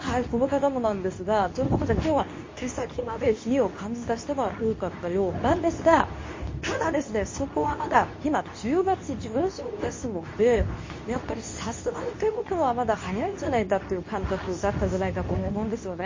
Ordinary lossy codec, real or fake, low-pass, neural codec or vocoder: MP3, 32 kbps; fake; 7.2 kHz; codec, 24 kHz, 0.9 kbps, WavTokenizer, medium speech release version 2